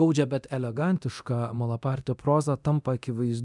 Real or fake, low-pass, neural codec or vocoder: fake; 10.8 kHz; codec, 24 kHz, 0.9 kbps, DualCodec